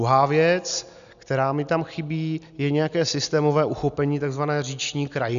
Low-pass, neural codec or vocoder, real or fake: 7.2 kHz; none; real